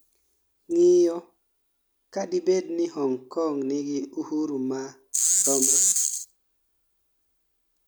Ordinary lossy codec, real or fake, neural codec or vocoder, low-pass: none; real; none; none